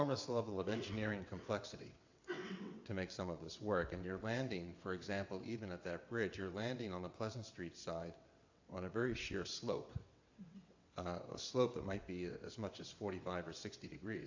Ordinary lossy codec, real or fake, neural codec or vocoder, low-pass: AAC, 48 kbps; fake; vocoder, 22.05 kHz, 80 mel bands, WaveNeXt; 7.2 kHz